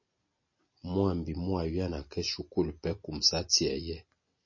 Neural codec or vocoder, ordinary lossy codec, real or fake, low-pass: none; MP3, 32 kbps; real; 7.2 kHz